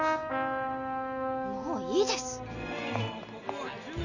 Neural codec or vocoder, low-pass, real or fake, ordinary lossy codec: none; 7.2 kHz; real; none